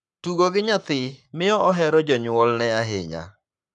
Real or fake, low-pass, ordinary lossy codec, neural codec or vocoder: fake; 10.8 kHz; none; codec, 44.1 kHz, 7.8 kbps, Pupu-Codec